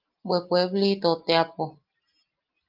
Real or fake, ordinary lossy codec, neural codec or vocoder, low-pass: real; Opus, 32 kbps; none; 5.4 kHz